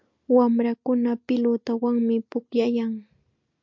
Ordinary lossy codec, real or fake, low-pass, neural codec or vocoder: MP3, 48 kbps; real; 7.2 kHz; none